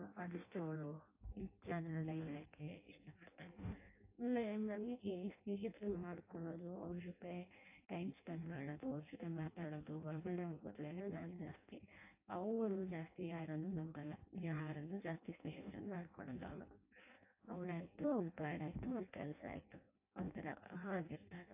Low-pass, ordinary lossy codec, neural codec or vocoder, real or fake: 3.6 kHz; AAC, 32 kbps; codec, 16 kHz in and 24 kHz out, 0.6 kbps, FireRedTTS-2 codec; fake